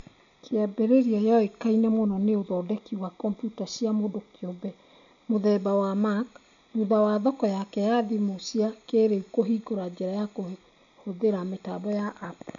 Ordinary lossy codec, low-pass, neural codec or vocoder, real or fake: none; 7.2 kHz; none; real